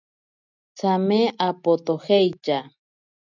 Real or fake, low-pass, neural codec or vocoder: real; 7.2 kHz; none